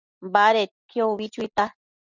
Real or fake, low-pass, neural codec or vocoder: real; 7.2 kHz; none